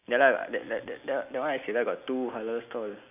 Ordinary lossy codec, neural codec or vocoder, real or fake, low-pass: none; none; real; 3.6 kHz